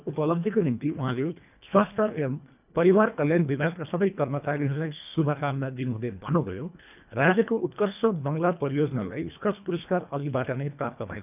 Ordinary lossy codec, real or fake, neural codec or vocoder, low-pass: none; fake; codec, 24 kHz, 1.5 kbps, HILCodec; 3.6 kHz